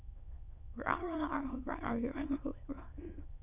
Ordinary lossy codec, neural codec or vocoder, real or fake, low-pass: none; autoencoder, 22.05 kHz, a latent of 192 numbers a frame, VITS, trained on many speakers; fake; 3.6 kHz